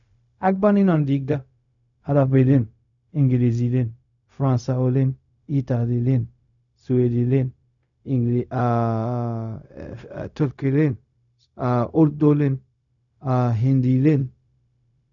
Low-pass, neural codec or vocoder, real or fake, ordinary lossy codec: 7.2 kHz; codec, 16 kHz, 0.4 kbps, LongCat-Audio-Codec; fake; none